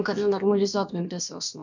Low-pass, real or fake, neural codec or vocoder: 7.2 kHz; fake; codec, 16 kHz, about 1 kbps, DyCAST, with the encoder's durations